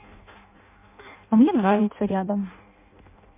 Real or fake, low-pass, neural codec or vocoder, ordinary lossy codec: fake; 3.6 kHz; codec, 16 kHz in and 24 kHz out, 0.6 kbps, FireRedTTS-2 codec; MP3, 24 kbps